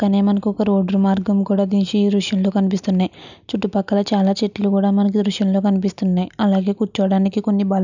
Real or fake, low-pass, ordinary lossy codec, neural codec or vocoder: real; 7.2 kHz; none; none